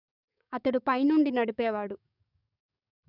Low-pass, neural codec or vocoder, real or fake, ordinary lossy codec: 5.4 kHz; codec, 44.1 kHz, 7.8 kbps, DAC; fake; none